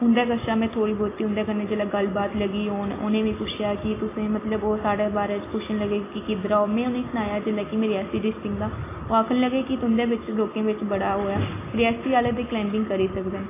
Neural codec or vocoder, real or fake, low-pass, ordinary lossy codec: none; real; 3.6 kHz; AAC, 16 kbps